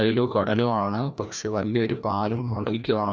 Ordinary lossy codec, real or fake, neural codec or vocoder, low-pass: none; fake; codec, 16 kHz, 1 kbps, FreqCodec, larger model; none